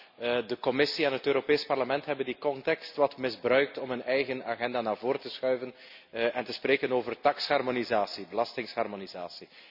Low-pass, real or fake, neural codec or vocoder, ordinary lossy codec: 5.4 kHz; real; none; none